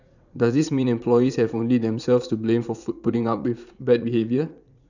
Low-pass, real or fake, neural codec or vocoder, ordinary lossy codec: 7.2 kHz; fake; vocoder, 44.1 kHz, 80 mel bands, Vocos; none